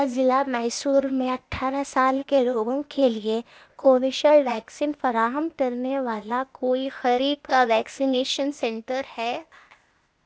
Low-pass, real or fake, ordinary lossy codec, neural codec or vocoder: none; fake; none; codec, 16 kHz, 0.8 kbps, ZipCodec